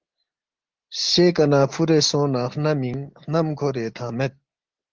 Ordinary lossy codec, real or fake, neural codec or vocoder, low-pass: Opus, 16 kbps; real; none; 7.2 kHz